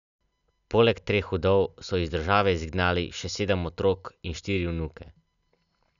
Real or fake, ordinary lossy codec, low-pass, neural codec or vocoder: real; none; 7.2 kHz; none